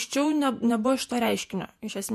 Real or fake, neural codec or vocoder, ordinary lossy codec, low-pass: fake; vocoder, 48 kHz, 128 mel bands, Vocos; MP3, 64 kbps; 14.4 kHz